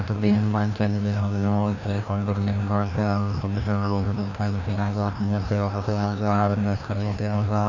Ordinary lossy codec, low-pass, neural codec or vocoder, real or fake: none; 7.2 kHz; codec, 16 kHz, 1 kbps, FreqCodec, larger model; fake